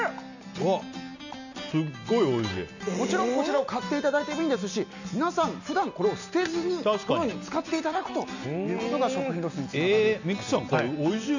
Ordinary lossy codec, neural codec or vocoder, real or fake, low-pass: none; none; real; 7.2 kHz